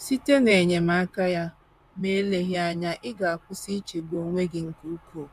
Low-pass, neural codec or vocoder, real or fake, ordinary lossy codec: 14.4 kHz; vocoder, 44.1 kHz, 128 mel bands, Pupu-Vocoder; fake; MP3, 96 kbps